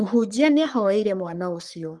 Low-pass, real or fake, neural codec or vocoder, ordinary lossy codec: 10.8 kHz; fake; codec, 44.1 kHz, 3.4 kbps, Pupu-Codec; Opus, 32 kbps